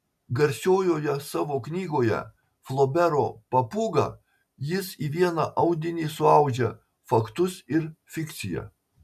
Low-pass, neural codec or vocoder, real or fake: 14.4 kHz; none; real